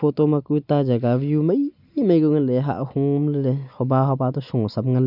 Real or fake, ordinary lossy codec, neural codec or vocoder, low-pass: real; none; none; 5.4 kHz